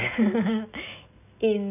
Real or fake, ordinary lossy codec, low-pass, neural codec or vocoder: real; none; 3.6 kHz; none